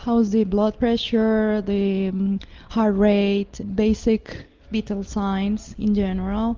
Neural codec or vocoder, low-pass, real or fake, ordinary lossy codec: none; 7.2 kHz; real; Opus, 24 kbps